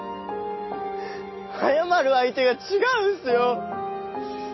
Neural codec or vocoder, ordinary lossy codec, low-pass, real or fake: none; MP3, 24 kbps; 7.2 kHz; real